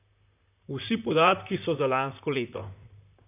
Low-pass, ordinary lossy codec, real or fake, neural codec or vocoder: 3.6 kHz; none; fake; vocoder, 44.1 kHz, 128 mel bands, Pupu-Vocoder